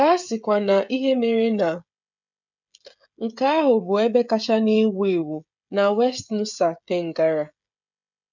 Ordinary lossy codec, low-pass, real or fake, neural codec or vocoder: none; 7.2 kHz; fake; codec, 16 kHz, 16 kbps, FreqCodec, smaller model